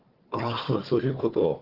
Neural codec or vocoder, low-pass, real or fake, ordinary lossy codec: codec, 16 kHz, 4 kbps, FunCodec, trained on Chinese and English, 50 frames a second; 5.4 kHz; fake; Opus, 16 kbps